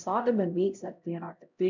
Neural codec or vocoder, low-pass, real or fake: codec, 16 kHz, 0.5 kbps, X-Codec, HuBERT features, trained on LibriSpeech; 7.2 kHz; fake